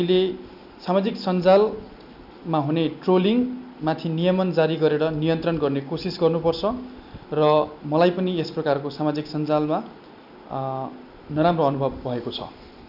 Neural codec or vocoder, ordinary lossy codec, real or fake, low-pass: none; none; real; 5.4 kHz